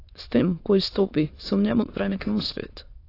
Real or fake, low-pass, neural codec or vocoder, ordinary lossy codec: fake; 5.4 kHz; autoencoder, 22.05 kHz, a latent of 192 numbers a frame, VITS, trained on many speakers; AAC, 32 kbps